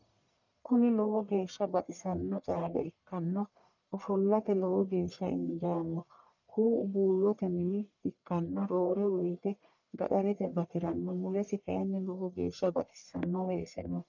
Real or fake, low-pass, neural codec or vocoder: fake; 7.2 kHz; codec, 44.1 kHz, 1.7 kbps, Pupu-Codec